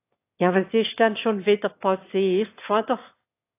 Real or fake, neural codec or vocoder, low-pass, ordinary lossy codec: fake; autoencoder, 22.05 kHz, a latent of 192 numbers a frame, VITS, trained on one speaker; 3.6 kHz; AAC, 24 kbps